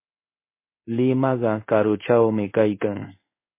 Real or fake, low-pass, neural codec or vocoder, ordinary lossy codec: fake; 3.6 kHz; codec, 16 kHz in and 24 kHz out, 1 kbps, XY-Tokenizer; MP3, 24 kbps